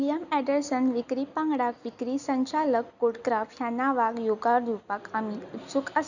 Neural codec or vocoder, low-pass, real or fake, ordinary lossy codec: none; 7.2 kHz; real; none